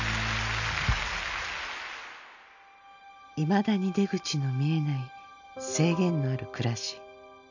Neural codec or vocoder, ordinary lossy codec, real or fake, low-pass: none; none; real; 7.2 kHz